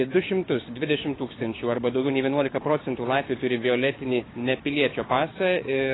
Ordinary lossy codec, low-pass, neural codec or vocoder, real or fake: AAC, 16 kbps; 7.2 kHz; codec, 16 kHz, 6 kbps, DAC; fake